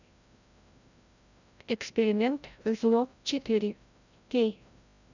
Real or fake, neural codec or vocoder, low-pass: fake; codec, 16 kHz, 0.5 kbps, FreqCodec, larger model; 7.2 kHz